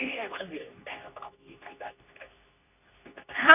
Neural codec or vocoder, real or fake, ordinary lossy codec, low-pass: codec, 24 kHz, 0.9 kbps, WavTokenizer, medium speech release version 2; fake; none; 3.6 kHz